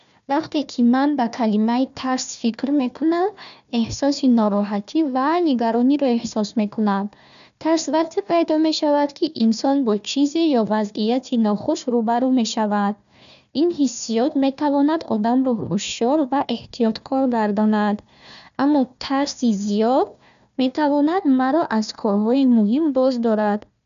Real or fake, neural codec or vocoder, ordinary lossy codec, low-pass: fake; codec, 16 kHz, 1 kbps, FunCodec, trained on Chinese and English, 50 frames a second; none; 7.2 kHz